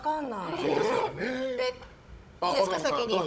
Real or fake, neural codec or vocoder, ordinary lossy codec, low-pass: fake; codec, 16 kHz, 16 kbps, FunCodec, trained on Chinese and English, 50 frames a second; none; none